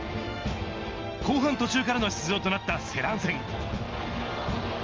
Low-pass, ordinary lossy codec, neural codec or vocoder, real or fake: 7.2 kHz; Opus, 32 kbps; none; real